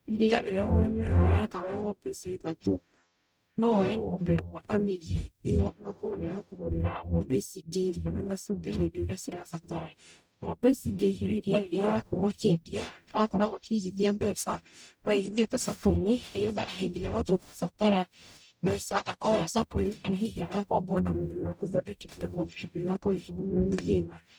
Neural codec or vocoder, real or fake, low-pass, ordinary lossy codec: codec, 44.1 kHz, 0.9 kbps, DAC; fake; none; none